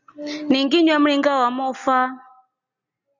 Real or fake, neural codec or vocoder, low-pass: real; none; 7.2 kHz